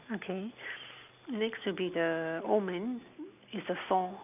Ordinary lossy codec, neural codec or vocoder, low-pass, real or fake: none; none; 3.6 kHz; real